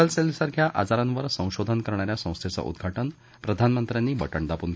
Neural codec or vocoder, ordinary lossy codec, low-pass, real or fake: none; none; none; real